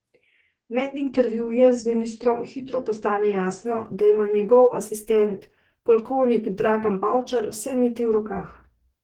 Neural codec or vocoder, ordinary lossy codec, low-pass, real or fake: codec, 44.1 kHz, 2.6 kbps, DAC; Opus, 16 kbps; 19.8 kHz; fake